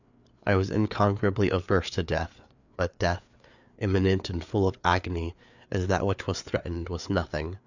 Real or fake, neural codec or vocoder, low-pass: fake; codec, 16 kHz, 4 kbps, FreqCodec, larger model; 7.2 kHz